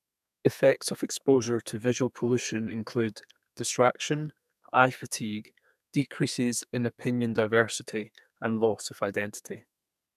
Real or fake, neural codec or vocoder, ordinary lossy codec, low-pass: fake; codec, 32 kHz, 1.9 kbps, SNAC; none; 14.4 kHz